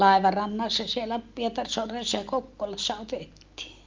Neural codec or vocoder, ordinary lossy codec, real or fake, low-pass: none; Opus, 24 kbps; real; 7.2 kHz